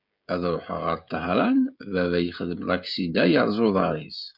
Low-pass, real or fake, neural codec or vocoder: 5.4 kHz; fake; codec, 16 kHz, 16 kbps, FreqCodec, smaller model